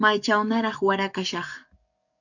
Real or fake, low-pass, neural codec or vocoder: fake; 7.2 kHz; codec, 44.1 kHz, 7.8 kbps, DAC